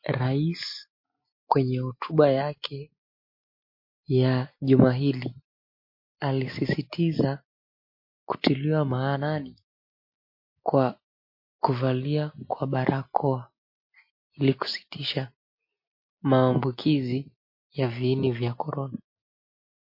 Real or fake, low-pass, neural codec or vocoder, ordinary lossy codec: real; 5.4 kHz; none; MP3, 32 kbps